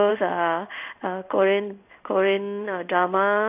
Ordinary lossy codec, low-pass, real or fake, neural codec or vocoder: none; 3.6 kHz; fake; codec, 16 kHz in and 24 kHz out, 1 kbps, XY-Tokenizer